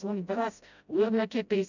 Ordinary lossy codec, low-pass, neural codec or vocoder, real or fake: none; 7.2 kHz; codec, 16 kHz, 0.5 kbps, FreqCodec, smaller model; fake